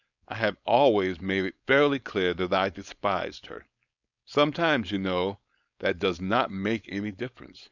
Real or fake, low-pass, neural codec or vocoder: fake; 7.2 kHz; codec, 16 kHz, 4.8 kbps, FACodec